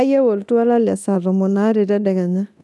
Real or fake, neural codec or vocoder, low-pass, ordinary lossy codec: fake; codec, 24 kHz, 0.9 kbps, DualCodec; none; none